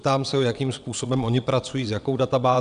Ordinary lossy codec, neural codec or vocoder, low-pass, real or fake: AAC, 96 kbps; vocoder, 22.05 kHz, 80 mel bands, WaveNeXt; 9.9 kHz; fake